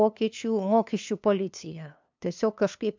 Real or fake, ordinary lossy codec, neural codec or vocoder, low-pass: fake; MP3, 64 kbps; codec, 16 kHz, 2 kbps, FunCodec, trained on LibriTTS, 25 frames a second; 7.2 kHz